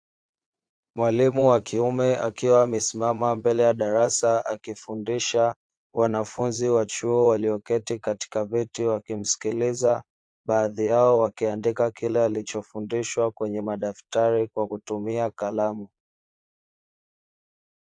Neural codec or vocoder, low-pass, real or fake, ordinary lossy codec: vocoder, 22.05 kHz, 80 mel bands, Vocos; 9.9 kHz; fake; AAC, 64 kbps